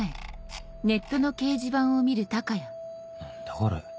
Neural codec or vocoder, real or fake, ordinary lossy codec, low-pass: none; real; none; none